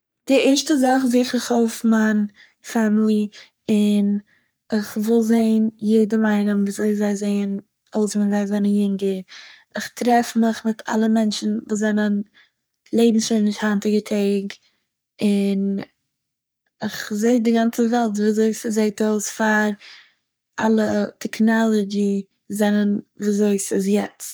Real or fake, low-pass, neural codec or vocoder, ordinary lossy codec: fake; none; codec, 44.1 kHz, 3.4 kbps, Pupu-Codec; none